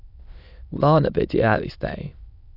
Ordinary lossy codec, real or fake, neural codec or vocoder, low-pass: none; fake; autoencoder, 22.05 kHz, a latent of 192 numbers a frame, VITS, trained on many speakers; 5.4 kHz